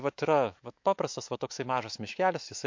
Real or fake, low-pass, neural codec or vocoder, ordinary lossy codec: fake; 7.2 kHz; autoencoder, 48 kHz, 128 numbers a frame, DAC-VAE, trained on Japanese speech; MP3, 48 kbps